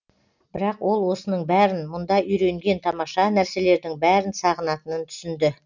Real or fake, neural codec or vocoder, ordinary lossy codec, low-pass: real; none; none; 7.2 kHz